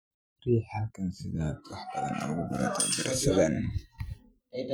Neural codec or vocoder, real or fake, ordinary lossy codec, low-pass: vocoder, 44.1 kHz, 128 mel bands every 512 samples, BigVGAN v2; fake; none; none